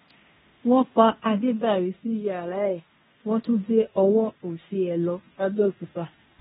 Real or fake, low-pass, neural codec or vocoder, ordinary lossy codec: fake; 10.8 kHz; codec, 16 kHz in and 24 kHz out, 0.9 kbps, LongCat-Audio-Codec, fine tuned four codebook decoder; AAC, 16 kbps